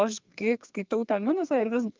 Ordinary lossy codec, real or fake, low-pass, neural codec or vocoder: Opus, 32 kbps; fake; 7.2 kHz; codec, 32 kHz, 1.9 kbps, SNAC